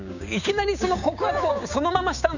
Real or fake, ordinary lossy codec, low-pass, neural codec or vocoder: fake; none; 7.2 kHz; codec, 16 kHz, 6 kbps, DAC